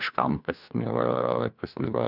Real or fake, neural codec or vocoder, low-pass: fake; codec, 16 kHz, 1 kbps, FunCodec, trained on LibriTTS, 50 frames a second; 5.4 kHz